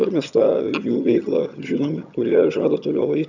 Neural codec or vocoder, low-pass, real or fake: vocoder, 22.05 kHz, 80 mel bands, HiFi-GAN; 7.2 kHz; fake